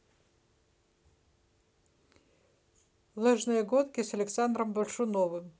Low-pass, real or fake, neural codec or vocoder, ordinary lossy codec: none; real; none; none